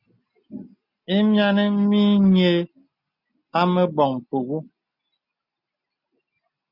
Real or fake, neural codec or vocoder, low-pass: real; none; 5.4 kHz